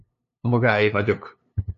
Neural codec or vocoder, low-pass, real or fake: codec, 16 kHz, 2 kbps, FunCodec, trained on LibriTTS, 25 frames a second; 7.2 kHz; fake